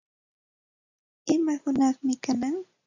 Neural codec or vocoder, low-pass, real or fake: none; 7.2 kHz; real